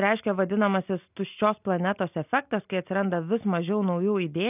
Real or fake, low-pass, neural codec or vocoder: real; 3.6 kHz; none